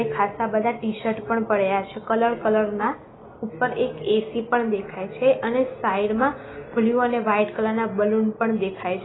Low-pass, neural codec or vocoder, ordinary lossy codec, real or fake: 7.2 kHz; none; AAC, 16 kbps; real